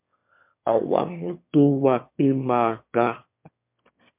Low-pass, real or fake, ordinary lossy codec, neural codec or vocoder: 3.6 kHz; fake; MP3, 32 kbps; autoencoder, 22.05 kHz, a latent of 192 numbers a frame, VITS, trained on one speaker